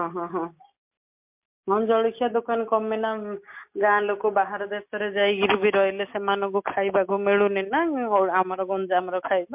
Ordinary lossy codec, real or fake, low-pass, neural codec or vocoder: MP3, 32 kbps; real; 3.6 kHz; none